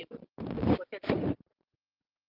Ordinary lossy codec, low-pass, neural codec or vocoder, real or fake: Opus, 24 kbps; 5.4 kHz; none; real